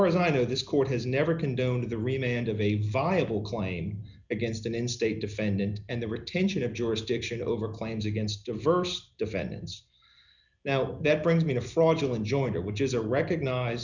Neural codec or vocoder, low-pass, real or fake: none; 7.2 kHz; real